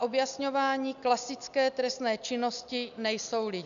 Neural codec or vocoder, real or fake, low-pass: none; real; 7.2 kHz